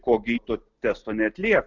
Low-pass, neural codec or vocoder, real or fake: 7.2 kHz; none; real